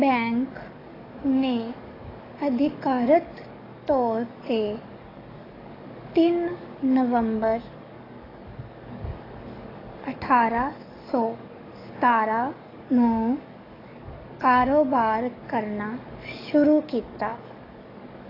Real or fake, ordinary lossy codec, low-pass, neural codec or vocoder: fake; AAC, 24 kbps; 5.4 kHz; codec, 44.1 kHz, 7.8 kbps, DAC